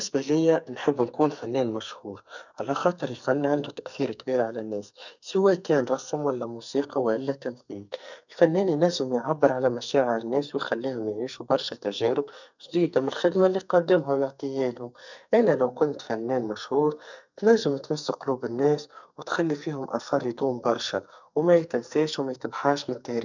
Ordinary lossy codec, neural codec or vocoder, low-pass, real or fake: none; codec, 44.1 kHz, 2.6 kbps, SNAC; 7.2 kHz; fake